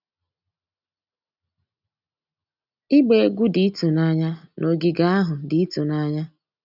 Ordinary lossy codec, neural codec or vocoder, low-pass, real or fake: none; none; 5.4 kHz; real